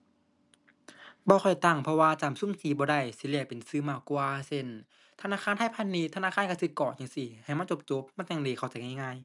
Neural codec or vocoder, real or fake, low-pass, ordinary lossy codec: none; real; 10.8 kHz; none